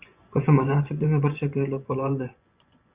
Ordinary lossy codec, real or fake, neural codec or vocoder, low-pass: Opus, 64 kbps; fake; vocoder, 24 kHz, 100 mel bands, Vocos; 3.6 kHz